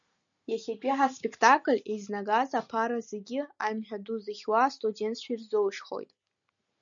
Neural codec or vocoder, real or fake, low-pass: none; real; 7.2 kHz